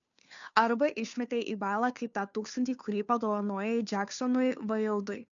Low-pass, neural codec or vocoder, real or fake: 7.2 kHz; codec, 16 kHz, 2 kbps, FunCodec, trained on Chinese and English, 25 frames a second; fake